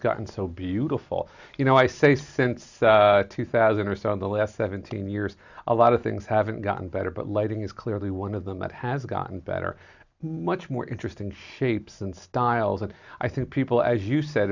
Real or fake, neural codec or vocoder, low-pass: real; none; 7.2 kHz